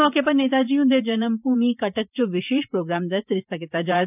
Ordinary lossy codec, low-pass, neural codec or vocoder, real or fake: none; 3.6 kHz; vocoder, 44.1 kHz, 128 mel bands every 512 samples, BigVGAN v2; fake